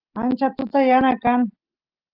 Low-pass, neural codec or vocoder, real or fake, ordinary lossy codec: 5.4 kHz; none; real; Opus, 24 kbps